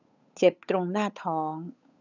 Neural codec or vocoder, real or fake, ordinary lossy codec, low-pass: codec, 16 kHz, 8 kbps, FunCodec, trained on Chinese and English, 25 frames a second; fake; none; 7.2 kHz